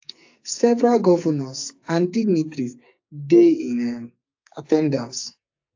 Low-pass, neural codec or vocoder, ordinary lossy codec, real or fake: 7.2 kHz; codec, 44.1 kHz, 2.6 kbps, SNAC; AAC, 48 kbps; fake